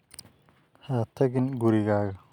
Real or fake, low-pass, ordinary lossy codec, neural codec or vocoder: real; 19.8 kHz; none; none